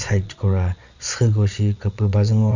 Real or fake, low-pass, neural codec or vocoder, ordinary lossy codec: fake; 7.2 kHz; vocoder, 22.05 kHz, 80 mel bands, Vocos; Opus, 64 kbps